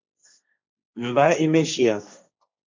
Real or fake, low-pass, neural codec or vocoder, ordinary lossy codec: fake; 7.2 kHz; codec, 16 kHz, 1.1 kbps, Voila-Tokenizer; MP3, 64 kbps